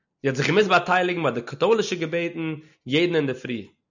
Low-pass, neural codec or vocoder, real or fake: 7.2 kHz; none; real